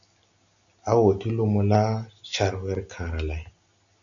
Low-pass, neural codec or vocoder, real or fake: 7.2 kHz; none; real